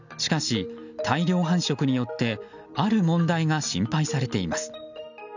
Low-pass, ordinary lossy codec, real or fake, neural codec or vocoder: 7.2 kHz; none; real; none